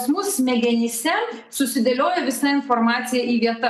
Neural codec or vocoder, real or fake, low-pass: none; real; 14.4 kHz